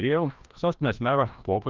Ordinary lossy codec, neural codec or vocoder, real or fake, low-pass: Opus, 24 kbps; codec, 16 kHz, 1 kbps, FreqCodec, larger model; fake; 7.2 kHz